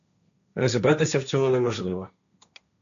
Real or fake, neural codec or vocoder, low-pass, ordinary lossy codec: fake; codec, 16 kHz, 1.1 kbps, Voila-Tokenizer; 7.2 kHz; MP3, 96 kbps